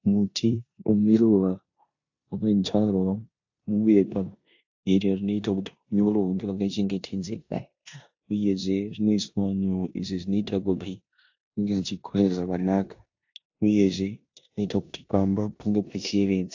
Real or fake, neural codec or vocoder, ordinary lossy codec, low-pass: fake; codec, 16 kHz in and 24 kHz out, 0.9 kbps, LongCat-Audio-Codec, four codebook decoder; AAC, 48 kbps; 7.2 kHz